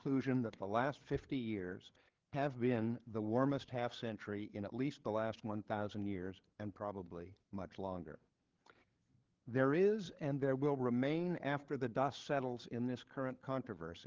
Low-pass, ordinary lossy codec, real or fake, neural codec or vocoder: 7.2 kHz; Opus, 16 kbps; fake; codec, 16 kHz, 8 kbps, FreqCodec, larger model